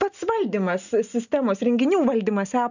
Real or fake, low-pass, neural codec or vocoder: real; 7.2 kHz; none